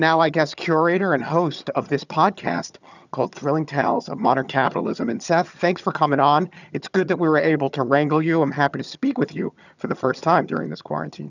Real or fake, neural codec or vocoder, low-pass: fake; vocoder, 22.05 kHz, 80 mel bands, HiFi-GAN; 7.2 kHz